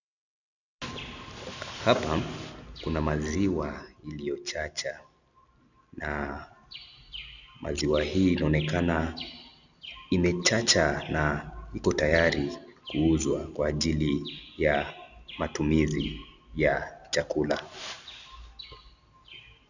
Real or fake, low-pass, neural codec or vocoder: real; 7.2 kHz; none